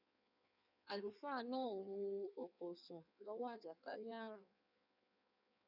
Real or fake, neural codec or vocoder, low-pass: fake; codec, 16 kHz in and 24 kHz out, 1.1 kbps, FireRedTTS-2 codec; 5.4 kHz